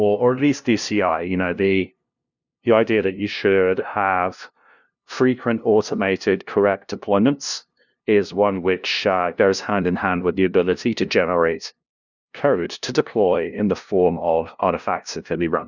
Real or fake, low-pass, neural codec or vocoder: fake; 7.2 kHz; codec, 16 kHz, 0.5 kbps, FunCodec, trained on LibriTTS, 25 frames a second